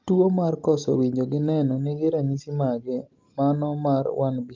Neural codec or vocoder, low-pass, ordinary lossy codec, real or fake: none; 7.2 kHz; Opus, 24 kbps; real